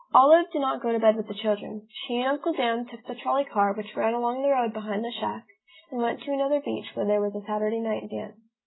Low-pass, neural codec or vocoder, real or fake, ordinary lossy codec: 7.2 kHz; none; real; AAC, 16 kbps